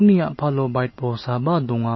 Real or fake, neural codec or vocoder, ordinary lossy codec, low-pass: real; none; MP3, 24 kbps; 7.2 kHz